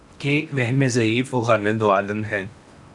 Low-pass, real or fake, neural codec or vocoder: 10.8 kHz; fake; codec, 16 kHz in and 24 kHz out, 0.8 kbps, FocalCodec, streaming, 65536 codes